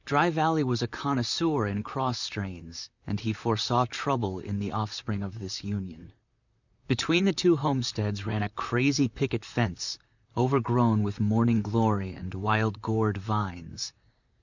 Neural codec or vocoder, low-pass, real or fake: vocoder, 44.1 kHz, 128 mel bands, Pupu-Vocoder; 7.2 kHz; fake